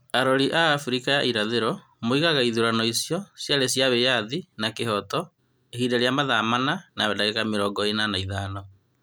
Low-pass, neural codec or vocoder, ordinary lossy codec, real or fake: none; none; none; real